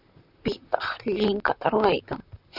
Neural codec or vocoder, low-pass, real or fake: vocoder, 44.1 kHz, 128 mel bands, Pupu-Vocoder; 5.4 kHz; fake